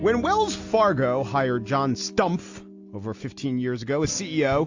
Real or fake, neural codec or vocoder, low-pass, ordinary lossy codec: real; none; 7.2 kHz; AAC, 48 kbps